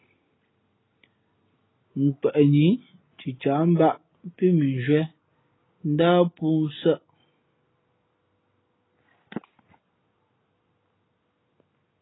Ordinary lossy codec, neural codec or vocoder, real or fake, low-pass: AAC, 16 kbps; none; real; 7.2 kHz